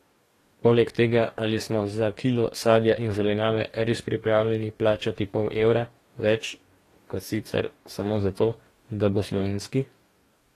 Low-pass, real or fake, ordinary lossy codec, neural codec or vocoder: 14.4 kHz; fake; AAC, 48 kbps; codec, 44.1 kHz, 2.6 kbps, DAC